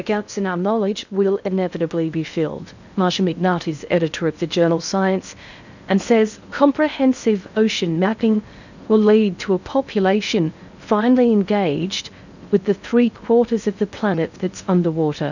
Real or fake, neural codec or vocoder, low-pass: fake; codec, 16 kHz in and 24 kHz out, 0.6 kbps, FocalCodec, streaming, 2048 codes; 7.2 kHz